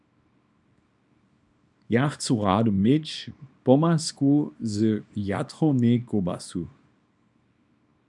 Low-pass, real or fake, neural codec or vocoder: 10.8 kHz; fake; codec, 24 kHz, 0.9 kbps, WavTokenizer, small release